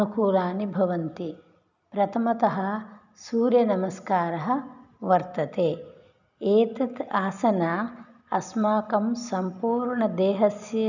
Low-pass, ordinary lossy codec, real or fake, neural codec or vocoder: 7.2 kHz; none; real; none